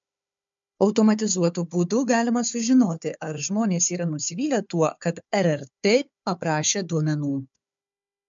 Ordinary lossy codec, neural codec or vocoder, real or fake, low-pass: MP3, 64 kbps; codec, 16 kHz, 4 kbps, FunCodec, trained on Chinese and English, 50 frames a second; fake; 7.2 kHz